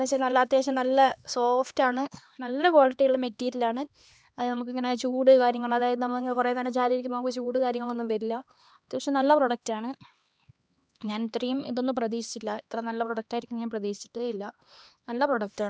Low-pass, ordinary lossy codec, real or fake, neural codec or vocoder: none; none; fake; codec, 16 kHz, 2 kbps, X-Codec, HuBERT features, trained on LibriSpeech